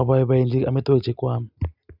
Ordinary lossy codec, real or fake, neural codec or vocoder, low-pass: none; real; none; 5.4 kHz